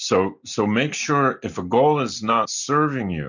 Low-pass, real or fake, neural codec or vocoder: 7.2 kHz; real; none